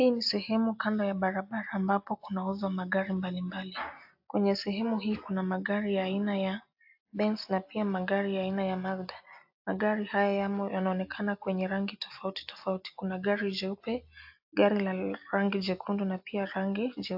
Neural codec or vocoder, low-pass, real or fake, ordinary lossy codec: none; 5.4 kHz; real; AAC, 48 kbps